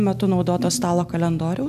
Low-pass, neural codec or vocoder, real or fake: 14.4 kHz; none; real